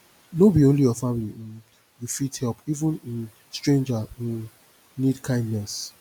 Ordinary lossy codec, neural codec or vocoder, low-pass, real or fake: none; none; 19.8 kHz; real